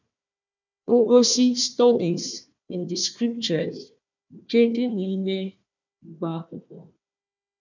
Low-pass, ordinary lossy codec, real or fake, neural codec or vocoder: 7.2 kHz; none; fake; codec, 16 kHz, 1 kbps, FunCodec, trained on Chinese and English, 50 frames a second